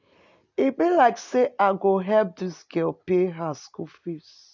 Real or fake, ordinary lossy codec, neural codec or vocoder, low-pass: real; none; none; 7.2 kHz